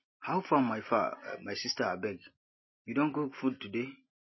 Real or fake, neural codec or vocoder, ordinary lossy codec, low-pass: real; none; MP3, 24 kbps; 7.2 kHz